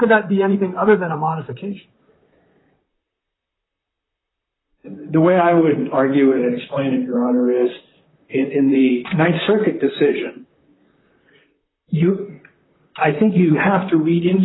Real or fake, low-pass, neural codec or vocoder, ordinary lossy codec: fake; 7.2 kHz; vocoder, 44.1 kHz, 128 mel bands, Pupu-Vocoder; AAC, 16 kbps